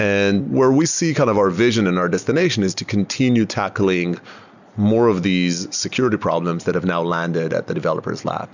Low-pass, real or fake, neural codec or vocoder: 7.2 kHz; real; none